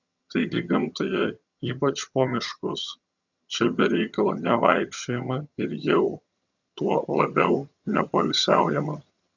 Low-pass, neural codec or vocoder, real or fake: 7.2 kHz; vocoder, 22.05 kHz, 80 mel bands, HiFi-GAN; fake